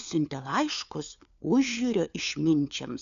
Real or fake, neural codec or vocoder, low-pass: fake; codec, 16 kHz, 4 kbps, FunCodec, trained on LibriTTS, 50 frames a second; 7.2 kHz